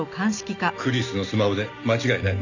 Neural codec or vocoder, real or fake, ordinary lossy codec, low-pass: none; real; none; 7.2 kHz